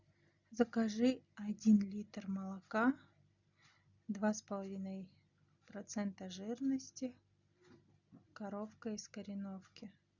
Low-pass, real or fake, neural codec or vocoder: 7.2 kHz; real; none